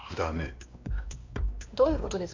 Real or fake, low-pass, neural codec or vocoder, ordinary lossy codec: fake; 7.2 kHz; codec, 16 kHz, 2 kbps, X-Codec, WavLM features, trained on Multilingual LibriSpeech; MP3, 64 kbps